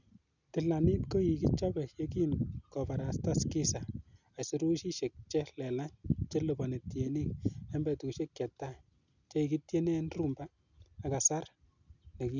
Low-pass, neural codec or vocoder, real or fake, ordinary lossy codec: 7.2 kHz; none; real; none